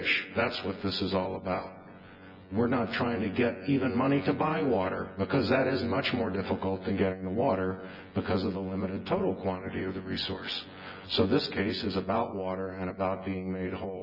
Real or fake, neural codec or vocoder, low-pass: fake; vocoder, 24 kHz, 100 mel bands, Vocos; 5.4 kHz